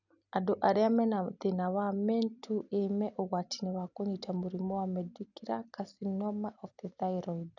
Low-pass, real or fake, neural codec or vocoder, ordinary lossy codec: 7.2 kHz; real; none; none